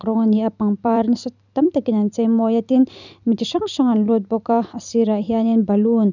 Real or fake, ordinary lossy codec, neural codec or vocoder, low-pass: fake; none; vocoder, 44.1 kHz, 128 mel bands every 256 samples, BigVGAN v2; 7.2 kHz